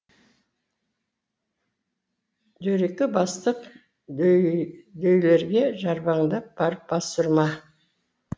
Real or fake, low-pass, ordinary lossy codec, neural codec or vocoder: real; none; none; none